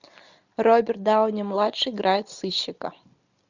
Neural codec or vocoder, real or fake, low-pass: none; real; 7.2 kHz